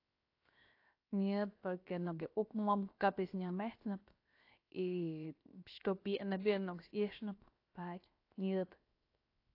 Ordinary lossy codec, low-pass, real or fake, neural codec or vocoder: AAC, 32 kbps; 5.4 kHz; fake; codec, 16 kHz, 0.7 kbps, FocalCodec